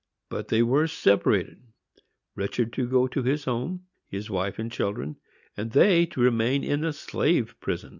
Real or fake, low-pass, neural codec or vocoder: real; 7.2 kHz; none